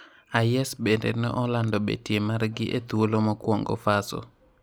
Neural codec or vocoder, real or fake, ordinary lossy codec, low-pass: none; real; none; none